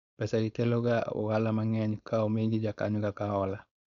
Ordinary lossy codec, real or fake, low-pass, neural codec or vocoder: none; fake; 7.2 kHz; codec, 16 kHz, 4.8 kbps, FACodec